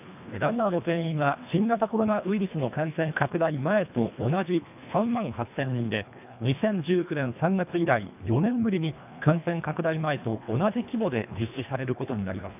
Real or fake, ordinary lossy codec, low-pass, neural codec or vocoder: fake; none; 3.6 kHz; codec, 24 kHz, 1.5 kbps, HILCodec